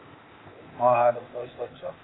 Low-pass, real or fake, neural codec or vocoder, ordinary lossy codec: 7.2 kHz; fake; codec, 16 kHz, 0.8 kbps, ZipCodec; AAC, 16 kbps